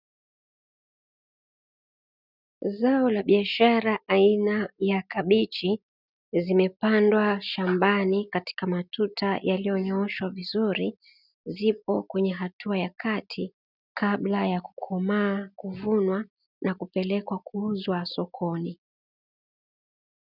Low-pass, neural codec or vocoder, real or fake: 5.4 kHz; none; real